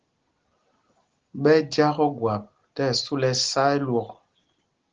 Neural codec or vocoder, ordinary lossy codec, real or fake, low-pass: none; Opus, 16 kbps; real; 7.2 kHz